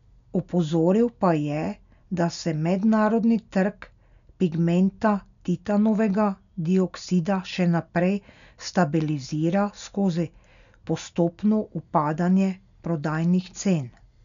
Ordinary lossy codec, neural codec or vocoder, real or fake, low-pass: none; none; real; 7.2 kHz